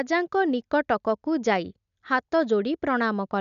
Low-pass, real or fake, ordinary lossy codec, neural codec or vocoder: 7.2 kHz; real; none; none